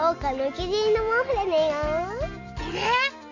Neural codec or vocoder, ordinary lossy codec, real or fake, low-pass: none; MP3, 64 kbps; real; 7.2 kHz